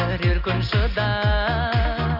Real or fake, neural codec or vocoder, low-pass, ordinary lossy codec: real; none; 5.4 kHz; none